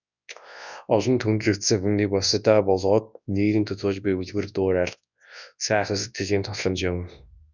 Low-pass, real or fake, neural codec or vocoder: 7.2 kHz; fake; codec, 24 kHz, 0.9 kbps, WavTokenizer, large speech release